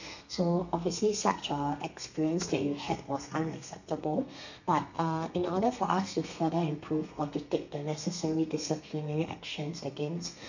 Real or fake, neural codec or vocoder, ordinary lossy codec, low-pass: fake; codec, 32 kHz, 1.9 kbps, SNAC; none; 7.2 kHz